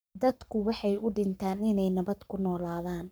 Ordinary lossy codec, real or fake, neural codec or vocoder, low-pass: none; fake; codec, 44.1 kHz, 7.8 kbps, Pupu-Codec; none